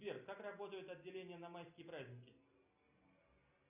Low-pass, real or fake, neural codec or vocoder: 3.6 kHz; real; none